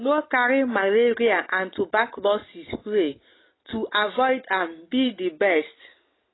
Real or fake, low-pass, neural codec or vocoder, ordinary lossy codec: real; 7.2 kHz; none; AAC, 16 kbps